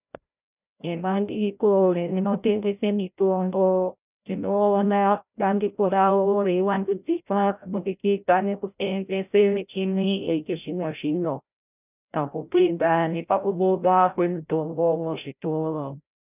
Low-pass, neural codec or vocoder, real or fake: 3.6 kHz; codec, 16 kHz, 0.5 kbps, FreqCodec, larger model; fake